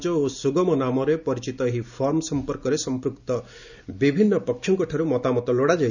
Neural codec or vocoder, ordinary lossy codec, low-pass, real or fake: none; none; 7.2 kHz; real